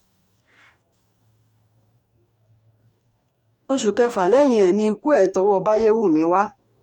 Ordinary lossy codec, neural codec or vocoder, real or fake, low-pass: none; codec, 44.1 kHz, 2.6 kbps, DAC; fake; 19.8 kHz